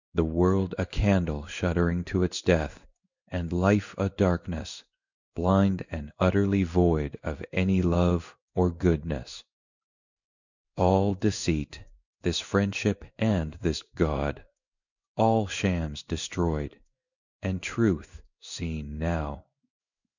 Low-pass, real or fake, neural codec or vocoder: 7.2 kHz; fake; codec, 16 kHz in and 24 kHz out, 1 kbps, XY-Tokenizer